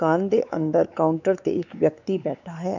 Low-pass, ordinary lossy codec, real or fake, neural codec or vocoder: 7.2 kHz; none; fake; codec, 16 kHz, 4 kbps, X-Codec, WavLM features, trained on Multilingual LibriSpeech